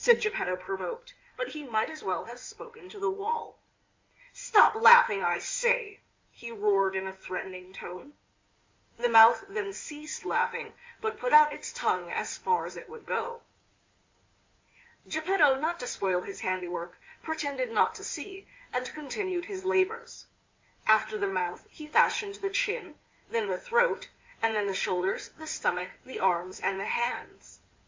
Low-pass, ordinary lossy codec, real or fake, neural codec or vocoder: 7.2 kHz; MP3, 64 kbps; fake; codec, 16 kHz in and 24 kHz out, 2.2 kbps, FireRedTTS-2 codec